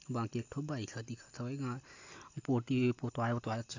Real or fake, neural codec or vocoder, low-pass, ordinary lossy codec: real; none; 7.2 kHz; AAC, 48 kbps